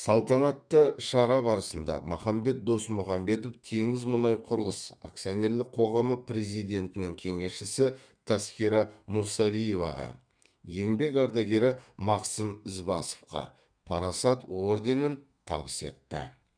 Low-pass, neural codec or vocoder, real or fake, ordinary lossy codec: 9.9 kHz; codec, 32 kHz, 1.9 kbps, SNAC; fake; none